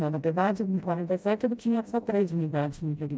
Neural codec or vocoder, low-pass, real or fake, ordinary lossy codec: codec, 16 kHz, 0.5 kbps, FreqCodec, smaller model; none; fake; none